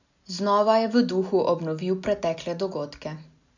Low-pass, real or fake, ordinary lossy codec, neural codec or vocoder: 7.2 kHz; real; none; none